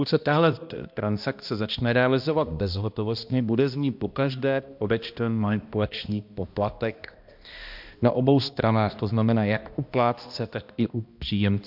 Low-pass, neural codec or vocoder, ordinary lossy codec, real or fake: 5.4 kHz; codec, 16 kHz, 1 kbps, X-Codec, HuBERT features, trained on balanced general audio; MP3, 48 kbps; fake